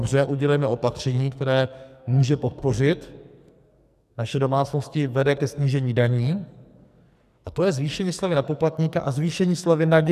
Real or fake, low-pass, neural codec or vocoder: fake; 14.4 kHz; codec, 44.1 kHz, 2.6 kbps, SNAC